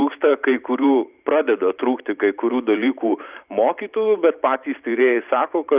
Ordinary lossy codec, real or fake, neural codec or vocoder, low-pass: Opus, 64 kbps; fake; vocoder, 44.1 kHz, 128 mel bands every 512 samples, BigVGAN v2; 3.6 kHz